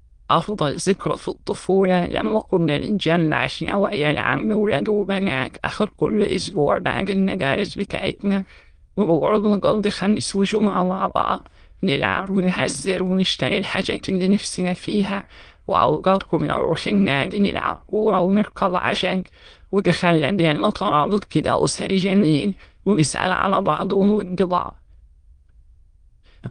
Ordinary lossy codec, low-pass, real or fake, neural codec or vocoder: Opus, 24 kbps; 9.9 kHz; fake; autoencoder, 22.05 kHz, a latent of 192 numbers a frame, VITS, trained on many speakers